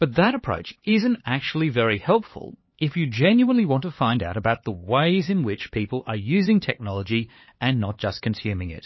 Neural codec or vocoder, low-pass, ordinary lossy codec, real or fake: codec, 16 kHz, 4 kbps, X-Codec, HuBERT features, trained on LibriSpeech; 7.2 kHz; MP3, 24 kbps; fake